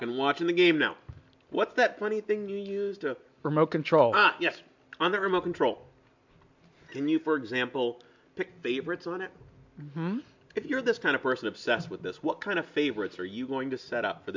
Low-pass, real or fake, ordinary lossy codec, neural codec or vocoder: 7.2 kHz; real; MP3, 64 kbps; none